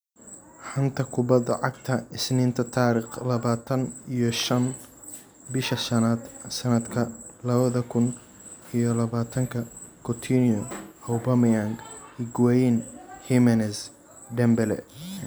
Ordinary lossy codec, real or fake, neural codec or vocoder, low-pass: none; real; none; none